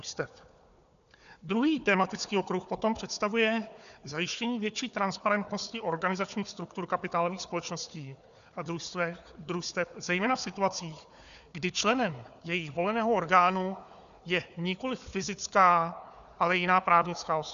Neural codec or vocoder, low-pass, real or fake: codec, 16 kHz, 4 kbps, FunCodec, trained on Chinese and English, 50 frames a second; 7.2 kHz; fake